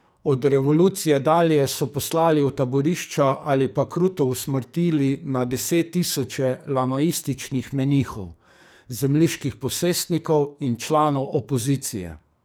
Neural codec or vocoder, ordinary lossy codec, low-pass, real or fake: codec, 44.1 kHz, 2.6 kbps, SNAC; none; none; fake